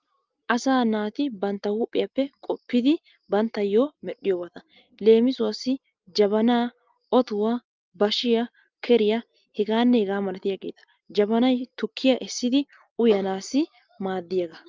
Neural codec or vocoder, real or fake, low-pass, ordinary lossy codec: none; real; 7.2 kHz; Opus, 24 kbps